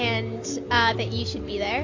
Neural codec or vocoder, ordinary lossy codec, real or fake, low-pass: none; AAC, 48 kbps; real; 7.2 kHz